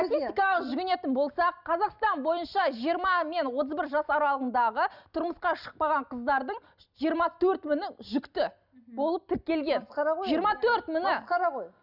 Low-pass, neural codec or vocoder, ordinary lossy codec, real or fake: 5.4 kHz; none; none; real